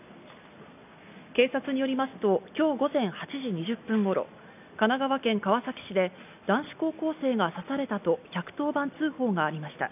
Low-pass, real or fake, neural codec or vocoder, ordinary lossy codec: 3.6 kHz; real; none; none